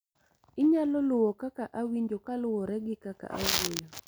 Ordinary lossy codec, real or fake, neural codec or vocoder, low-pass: none; real; none; none